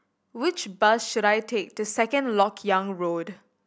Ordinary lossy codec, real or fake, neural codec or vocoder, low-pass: none; real; none; none